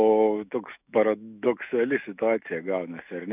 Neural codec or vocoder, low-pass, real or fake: autoencoder, 48 kHz, 128 numbers a frame, DAC-VAE, trained on Japanese speech; 3.6 kHz; fake